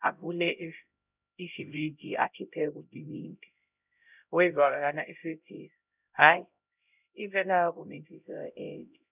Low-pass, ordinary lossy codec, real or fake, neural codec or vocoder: 3.6 kHz; none; fake; codec, 16 kHz, 0.5 kbps, X-Codec, HuBERT features, trained on LibriSpeech